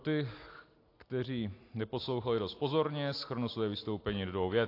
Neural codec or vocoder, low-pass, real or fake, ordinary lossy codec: none; 5.4 kHz; real; AAC, 32 kbps